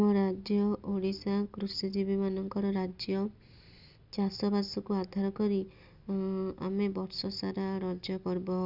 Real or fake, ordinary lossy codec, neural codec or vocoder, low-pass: real; none; none; 5.4 kHz